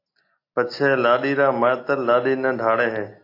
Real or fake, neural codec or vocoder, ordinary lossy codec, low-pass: real; none; AAC, 32 kbps; 5.4 kHz